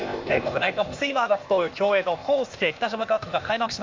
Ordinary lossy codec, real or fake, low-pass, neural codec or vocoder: MP3, 48 kbps; fake; 7.2 kHz; codec, 16 kHz, 0.8 kbps, ZipCodec